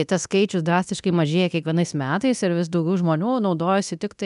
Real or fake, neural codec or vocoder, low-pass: fake; codec, 24 kHz, 0.9 kbps, DualCodec; 10.8 kHz